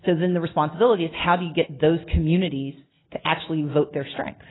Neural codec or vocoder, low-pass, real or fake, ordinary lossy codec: none; 7.2 kHz; real; AAC, 16 kbps